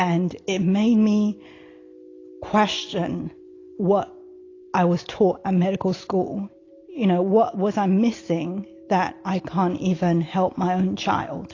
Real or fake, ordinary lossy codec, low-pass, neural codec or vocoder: real; AAC, 32 kbps; 7.2 kHz; none